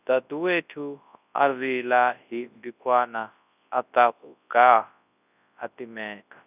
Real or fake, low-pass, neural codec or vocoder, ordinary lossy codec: fake; 3.6 kHz; codec, 24 kHz, 0.9 kbps, WavTokenizer, large speech release; none